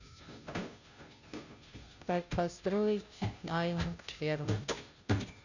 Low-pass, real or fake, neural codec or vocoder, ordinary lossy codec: 7.2 kHz; fake; codec, 16 kHz, 0.5 kbps, FunCodec, trained on Chinese and English, 25 frames a second; none